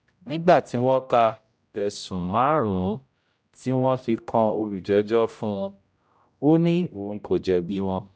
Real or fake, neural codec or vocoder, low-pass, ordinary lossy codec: fake; codec, 16 kHz, 0.5 kbps, X-Codec, HuBERT features, trained on general audio; none; none